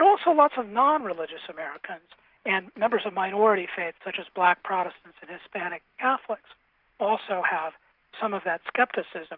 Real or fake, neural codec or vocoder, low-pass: real; none; 5.4 kHz